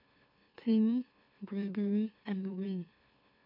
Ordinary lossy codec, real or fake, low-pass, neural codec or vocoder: none; fake; 5.4 kHz; autoencoder, 44.1 kHz, a latent of 192 numbers a frame, MeloTTS